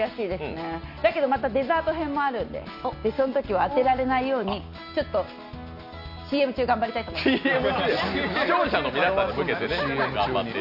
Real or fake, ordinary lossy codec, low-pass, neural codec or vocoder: real; none; 5.4 kHz; none